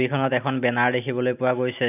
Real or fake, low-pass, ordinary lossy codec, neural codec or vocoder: real; 3.6 kHz; none; none